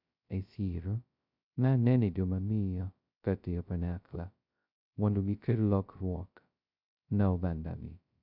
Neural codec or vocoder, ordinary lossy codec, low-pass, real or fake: codec, 16 kHz, 0.2 kbps, FocalCodec; none; 5.4 kHz; fake